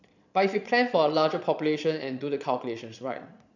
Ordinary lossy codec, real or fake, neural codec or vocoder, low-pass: none; fake; vocoder, 22.05 kHz, 80 mel bands, Vocos; 7.2 kHz